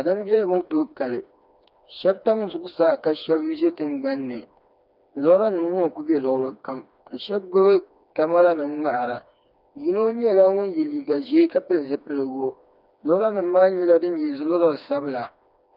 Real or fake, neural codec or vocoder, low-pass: fake; codec, 16 kHz, 2 kbps, FreqCodec, smaller model; 5.4 kHz